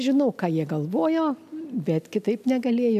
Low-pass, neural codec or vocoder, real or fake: 14.4 kHz; none; real